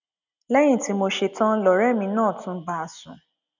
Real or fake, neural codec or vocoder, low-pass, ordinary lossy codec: real; none; 7.2 kHz; none